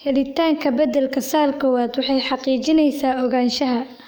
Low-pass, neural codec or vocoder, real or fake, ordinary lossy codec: none; codec, 44.1 kHz, 7.8 kbps, DAC; fake; none